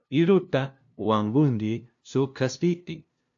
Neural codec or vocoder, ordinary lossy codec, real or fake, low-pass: codec, 16 kHz, 0.5 kbps, FunCodec, trained on LibriTTS, 25 frames a second; AAC, 64 kbps; fake; 7.2 kHz